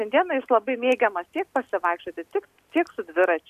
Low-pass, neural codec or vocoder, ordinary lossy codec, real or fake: 14.4 kHz; none; AAC, 96 kbps; real